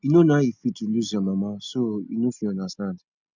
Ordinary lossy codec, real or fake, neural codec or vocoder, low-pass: none; real; none; 7.2 kHz